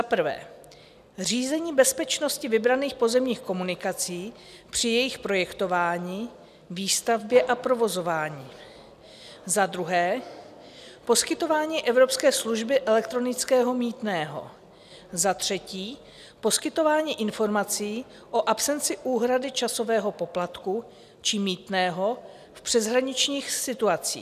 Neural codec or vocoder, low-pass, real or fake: none; 14.4 kHz; real